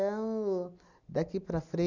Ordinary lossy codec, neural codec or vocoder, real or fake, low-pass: none; none; real; 7.2 kHz